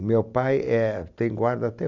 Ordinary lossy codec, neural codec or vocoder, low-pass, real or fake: none; none; 7.2 kHz; real